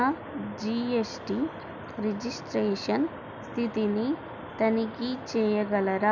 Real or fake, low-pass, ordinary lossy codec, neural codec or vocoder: real; 7.2 kHz; none; none